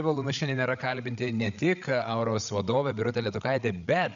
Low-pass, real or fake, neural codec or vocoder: 7.2 kHz; fake; codec, 16 kHz, 8 kbps, FreqCodec, larger model